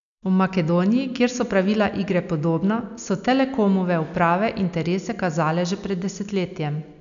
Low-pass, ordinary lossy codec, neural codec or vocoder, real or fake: 7.2 kHz; none; none; real